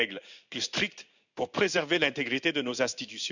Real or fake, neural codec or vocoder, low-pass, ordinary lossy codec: fake; codec, 16 kHz in and 24 kHz out, 1 kbps, XY-Tokenizer; 7.2 kHz; none